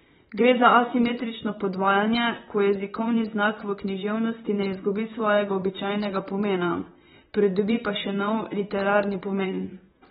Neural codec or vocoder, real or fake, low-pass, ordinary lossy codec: vocoder, 44.1 kHz, 128 mel bands, Pupu-Vocoder; fake; 19.8 kHz; AAC, 16 kbps